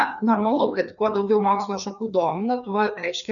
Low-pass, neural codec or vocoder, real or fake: 7.2 kHz; codec, 16 kHz, 2 kbps, FreqCodec, larger model; fake